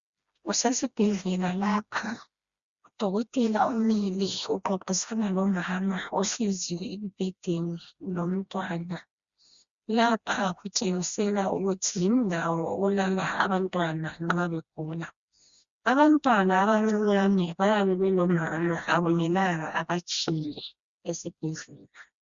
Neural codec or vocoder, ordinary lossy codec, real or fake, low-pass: codec, 16 kHz, 1 kbps, FreqCodec, smaller model; Opus, 64 kbps; fake; 7.2 kHz